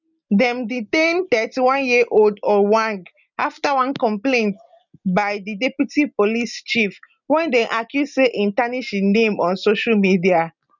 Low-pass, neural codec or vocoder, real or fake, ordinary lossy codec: 7.2 kHz; none; real; none